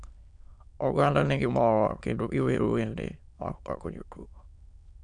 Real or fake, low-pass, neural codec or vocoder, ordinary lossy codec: fake; 9.9 kHz; autoencoder, 22.05 kHz, a latent of 192 numbers a frame, VITS, trained on many speakers; none